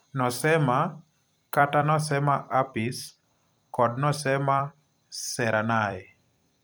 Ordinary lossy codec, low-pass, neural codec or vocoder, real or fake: none; none; vocoder, 44.1 kHz, 128 mel bands every 256 samples, BigVGAN v2; fake